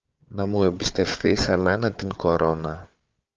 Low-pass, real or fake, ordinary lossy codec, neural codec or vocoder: 7.2 kHz; fake; Opus, 24 kbps; codec, 16 kHz, 4 kbps, FunCodec, trained on Chinese and English, 50 frames a second